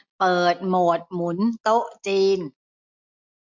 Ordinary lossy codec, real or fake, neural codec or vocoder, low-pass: MP3, 48 kbps; real; none; 7.2 kHz